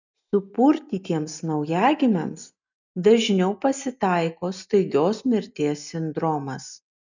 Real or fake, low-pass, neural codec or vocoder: real; 7.2 kHz; none